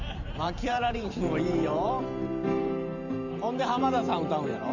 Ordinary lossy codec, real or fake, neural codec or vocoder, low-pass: none; fake; vocoder, 44.1 kHz, 128 mel bands every 512 samples, BigVGAN v2; 7.2 kHz